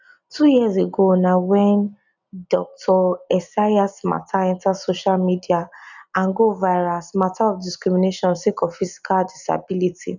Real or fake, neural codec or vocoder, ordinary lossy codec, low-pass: real; none; none; 7.2 kHz